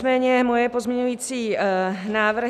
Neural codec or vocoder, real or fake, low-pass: none; real; 14.4 kHz